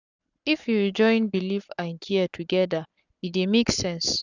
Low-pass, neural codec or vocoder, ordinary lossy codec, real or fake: 7.2 kHz; codec, 44.1 kHz, 7.8 kbps, Pupu-Codec; none; fake